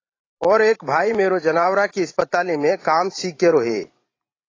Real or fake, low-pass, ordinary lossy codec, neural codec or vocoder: fake; 7.2 kHz; AAC, 32 kbps; vocoder, 44.1 kHz, 128 mel bands every 512 samples, BigVGAN v2